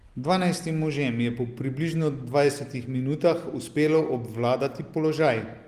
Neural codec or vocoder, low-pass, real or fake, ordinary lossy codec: none; 14.4 kHz; real; Opus, 24 kbps